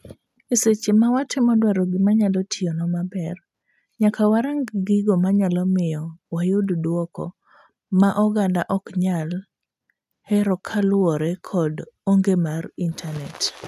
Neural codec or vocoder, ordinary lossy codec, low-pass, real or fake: none; none; 14.4 kHz; real